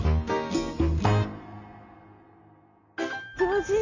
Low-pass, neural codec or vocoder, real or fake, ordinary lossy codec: 7.2 kHz; none; real; none